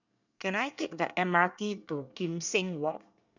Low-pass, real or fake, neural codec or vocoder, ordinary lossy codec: 7.2 kHz; fake; codec, 24 kHz, 1 kbps, SNAC; none